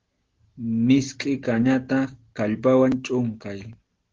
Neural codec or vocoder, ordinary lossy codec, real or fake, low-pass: none; Opus, 16 kbps; real; 7.2 kHz